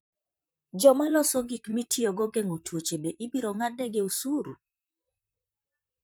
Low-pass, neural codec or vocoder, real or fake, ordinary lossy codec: none; vocoder, 44.1 kHz, 128 mel bands, Pupu-Vocoder; fake; none